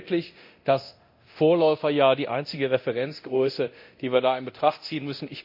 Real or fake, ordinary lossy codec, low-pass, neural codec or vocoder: fake; MP3, 32 kbps; 5.4 kHz; codec, 24 kHz, 0.9 kbps, DualCodec